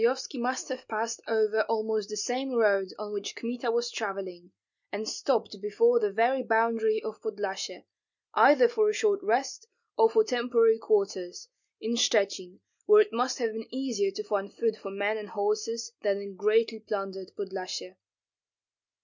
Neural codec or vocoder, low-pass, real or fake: none; 7.2 kHz; real